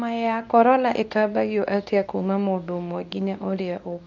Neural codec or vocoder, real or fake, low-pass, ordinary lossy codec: codec, 24 kHz, 0.9 kbps, WavTokenizer, medium speech release version 2; fake; 7.2 kHz; none